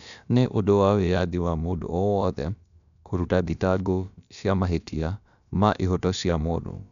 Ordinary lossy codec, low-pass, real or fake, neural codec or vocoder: none; 7.2 kHz; fake; codec, 16 kHz, about 1 kbps, DyCAST, with the encoder's durations